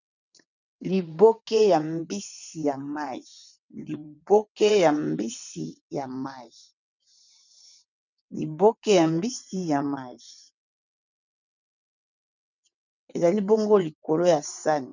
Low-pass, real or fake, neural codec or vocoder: 7.2 kHz; fake; vocoder, 22.05 kHz, 80 mel bands, WaveNeXt